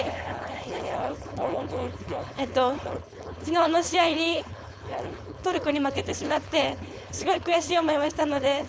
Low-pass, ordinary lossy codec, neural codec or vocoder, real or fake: none; none; codec, 16 kHz, 4.8 kbps, FACodec; fake